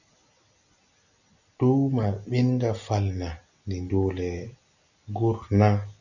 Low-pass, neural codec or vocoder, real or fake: 7.2 kHz; none; real